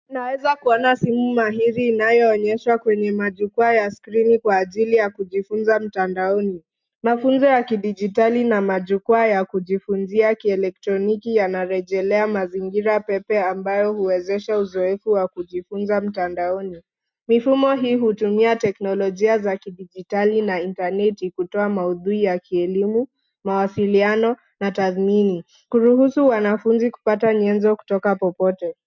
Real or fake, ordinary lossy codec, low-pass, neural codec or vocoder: real; MP3, 64 kbps; 7.2 kHz; none